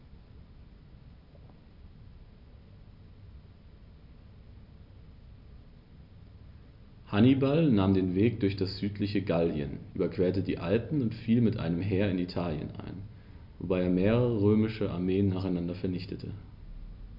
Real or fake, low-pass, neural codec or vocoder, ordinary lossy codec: real; 5.4 kHz; none; none